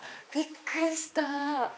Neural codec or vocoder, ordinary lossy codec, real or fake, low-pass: codec, 16 kHz, 2 kbps, X-Codec, HuBERT features, trained on balanced general audio; none; fake; none